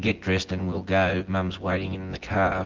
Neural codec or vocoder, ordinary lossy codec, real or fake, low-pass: vocoder, 24 kHz, 100 mel bands, Vocos; Opus, 16 kbps; fake; 7.2 kHz